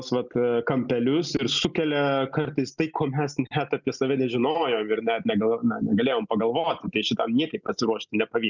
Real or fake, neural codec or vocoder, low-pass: real; none; 7.2 kHz